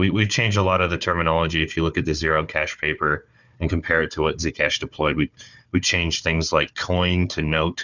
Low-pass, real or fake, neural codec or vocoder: 7.2 kHz; fake; codec, 16 kHz, 4 kbps, FunCodec, trained on Chinese and English, 50 frames a second